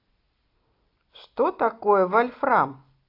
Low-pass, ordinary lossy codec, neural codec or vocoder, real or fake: 5.4 kHz; AAC, 32 kbps; none; real